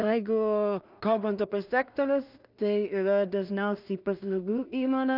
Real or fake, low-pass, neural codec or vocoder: fake; 5.4 kHz; codec, 16 kHz in and 24 kHz out, 0.4 kbps, LongCat-Audio-Codec, two codebook decoder